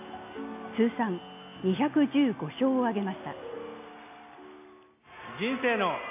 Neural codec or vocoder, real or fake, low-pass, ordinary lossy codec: none; real; 3.6 kHz; AAC, 32 kbps